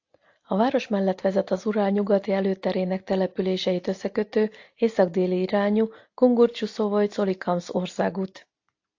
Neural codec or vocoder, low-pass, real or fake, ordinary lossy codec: none; 7.2 kHz; real; AAC, 48 kbps